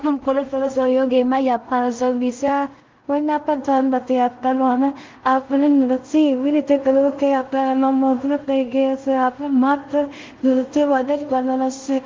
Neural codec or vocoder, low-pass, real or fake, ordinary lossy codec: codec, 16 kHz in and 24 kHz out, 0.4 kbps, LongCat-Audio-Codec, two codebook decoder; 7.2 kHz; fake; Opus, 32 kbps